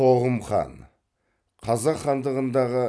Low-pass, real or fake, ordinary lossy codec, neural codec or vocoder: none; real; none; none